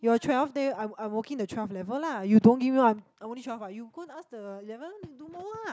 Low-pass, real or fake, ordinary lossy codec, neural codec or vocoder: none; real; none; none